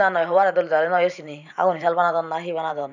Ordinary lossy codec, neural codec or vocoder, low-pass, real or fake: none; vocoder, 44.1 kHz, 128 mel bands every 512 samples, BigVGAN v2; 7.2 kHz; fake